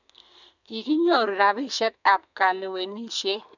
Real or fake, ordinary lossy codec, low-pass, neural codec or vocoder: fake; none; 7.2 kHz; codec, 32 kHz, 1.9 kbps, SNAC